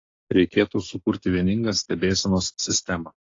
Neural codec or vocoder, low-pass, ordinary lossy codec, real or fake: none; 7.2 kHz; AAC, 32 kbps; real